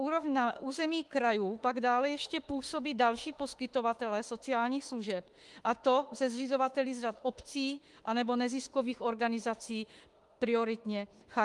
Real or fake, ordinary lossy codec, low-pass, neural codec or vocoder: fake; Opus, 24 kbps; 10.8 kHz; autoencoder, 48 kHz, 32 numbers a frame, DAC-VAE, trained on Japanese speech